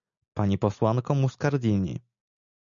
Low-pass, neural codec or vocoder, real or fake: 7.2 kHz; none; real